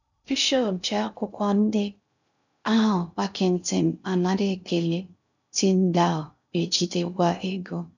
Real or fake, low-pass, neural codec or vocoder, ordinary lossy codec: fake; 7.2 kHz; codec, 16 kHz in and 24 kHz out, 0.6 kbps, FocalCodec, streaming, 2048 codes; none